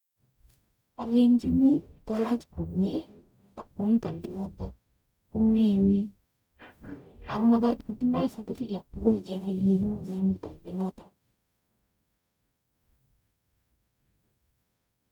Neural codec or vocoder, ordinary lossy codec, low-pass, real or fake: codec, 44.1 kHz, 0.9 kbps, DAC; none; 19.8 kHz; fake